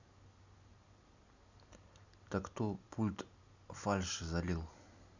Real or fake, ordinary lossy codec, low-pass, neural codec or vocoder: real; Opus, 64 kbps; 7.2 kHz; none